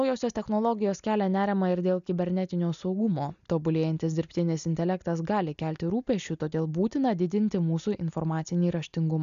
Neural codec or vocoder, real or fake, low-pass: none; real; 7.2 kHz